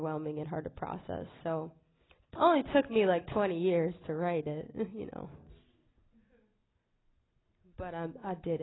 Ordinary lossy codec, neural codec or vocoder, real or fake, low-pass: AAC, 16 kbps; none; real; 7.2 kHz